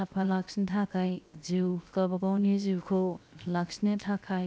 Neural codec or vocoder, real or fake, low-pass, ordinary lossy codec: codec, 16 kHz, 0.7 kbps, FocalCodec; fake; none; none